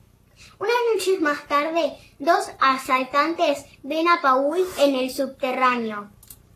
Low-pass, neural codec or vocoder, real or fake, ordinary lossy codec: 14.4 kHz; codec, 44.1 kHz, 7.8 kbps, Pupu-Codec; fake; AAC, 48 kbps